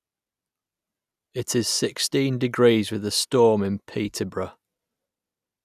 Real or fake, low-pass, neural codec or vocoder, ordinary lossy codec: real; 14.4 kHz; none; none